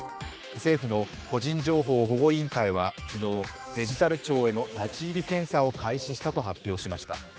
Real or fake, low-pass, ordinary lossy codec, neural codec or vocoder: fake; none; none; codec, 16 kHz, 2 kbps, X-Codec, HuBERT features, trained on general audio